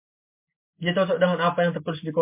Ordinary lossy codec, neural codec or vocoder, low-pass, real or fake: MP3, 32 kbps; none; 3.6 kHz; real